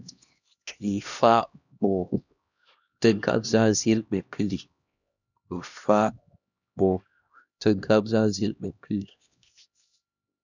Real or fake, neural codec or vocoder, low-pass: fake; codec, 16 kHz, 1 kbps, X-Codec, HuBERT features, trained on LibriSpeech; 7.2 kHz